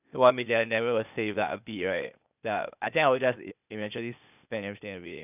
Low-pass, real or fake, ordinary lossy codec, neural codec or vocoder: 3.6 kHz; fake; none; codec, 16 kHz, 0.8 kbps, ZipCodec